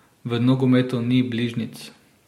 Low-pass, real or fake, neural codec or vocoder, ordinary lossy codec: 19.8 kHz; real; none; MP3, 64 kbps